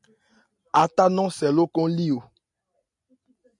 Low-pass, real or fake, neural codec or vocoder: 10.8 kHz; real; none